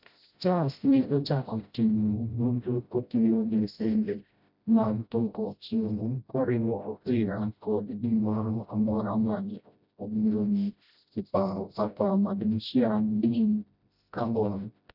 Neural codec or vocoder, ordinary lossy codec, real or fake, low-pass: codec, 16 kHz, 0.5 kbps, FreqCodec, smaller model; none; fake; 5.4 kHz